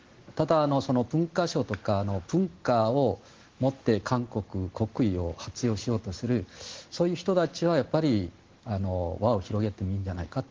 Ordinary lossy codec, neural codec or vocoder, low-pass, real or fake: Opus, 16 kbps; none; 7.2 kHz; real